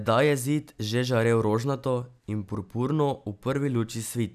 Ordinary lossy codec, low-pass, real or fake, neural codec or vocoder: none; 14.4 kHz; real; none